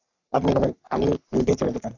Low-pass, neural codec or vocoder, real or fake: 7.2 kHz; codec, 44.1 kHz, 3.4 kbps, Pupu-Codec; fake